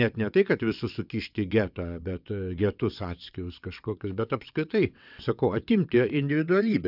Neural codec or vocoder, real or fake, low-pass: vocoder, 22.05 kHz, 80 mel bands, WaveNeXt; fake; 5.4 kHz